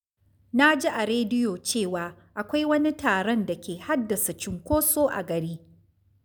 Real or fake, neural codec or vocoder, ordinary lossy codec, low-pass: real; none; none; none